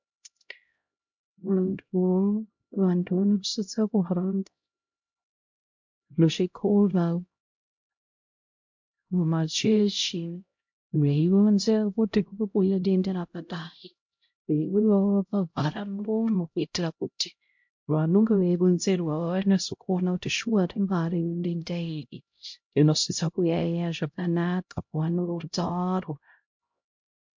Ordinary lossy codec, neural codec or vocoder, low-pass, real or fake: MP3, 48 kbps; codec, 16 kHz, 0.5 kbps, X-Codec, HuBERT features, trained on LibriSpeech; 7.2 kHz; fake